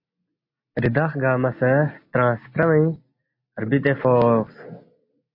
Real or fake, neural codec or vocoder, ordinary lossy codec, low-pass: real; none; MP3, 32 kbps; 5.4 kHz